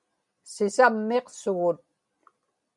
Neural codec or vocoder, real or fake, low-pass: none; real; 10.8 kHz